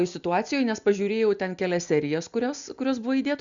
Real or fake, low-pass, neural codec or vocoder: real; 7.2 kHz; none